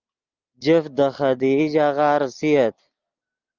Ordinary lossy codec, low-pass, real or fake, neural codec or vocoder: Opus, 24 kbps; 7.2 kHz; real; none